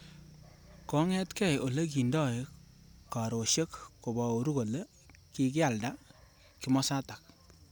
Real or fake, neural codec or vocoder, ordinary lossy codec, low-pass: real; none; none; none